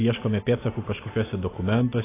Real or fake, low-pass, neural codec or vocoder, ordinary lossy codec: real; 3.6 kHz; none; AAC, 16 kbps